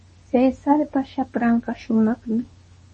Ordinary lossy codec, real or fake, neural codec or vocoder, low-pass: MP3, 32 kbps; fake; codec, 24 kHz, 0.9 kbps, WavTokenizer, medium speech release version 2; 10.8 kHz